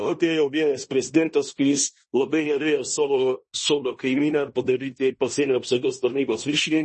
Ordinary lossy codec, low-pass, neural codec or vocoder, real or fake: MP3, 32 kbps; 10.8 kHz; codec, 16 kHz in and 24 kHz out, 0.9 kbps, LongCat-Audio-Codec, fine tuned four codebook decoder; fake